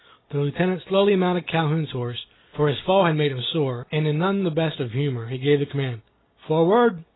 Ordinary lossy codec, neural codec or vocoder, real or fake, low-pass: AAC, 16 kbps; none; real; 7.2 kHz